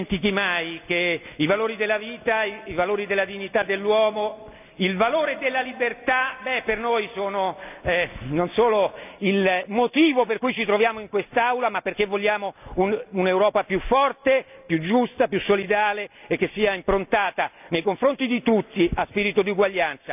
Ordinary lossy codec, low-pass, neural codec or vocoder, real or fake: none; 3.6 kHz; none; real